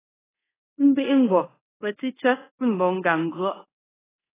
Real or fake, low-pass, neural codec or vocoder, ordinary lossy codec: fake; 3.6 kHz; codec, 24 kHz, 0.5 kbps, DualCodec; AAC, 16 kbps